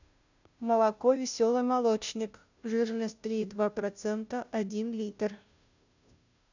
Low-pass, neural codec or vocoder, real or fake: 7.2 kHz; codec, 16 kHz, 0.5 kbps, FunCodec, trained on Chinese and English, 25 frames a second; fake